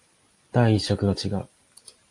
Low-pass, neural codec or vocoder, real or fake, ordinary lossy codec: 10.8 kHz; none; real; AAC, 48 kbps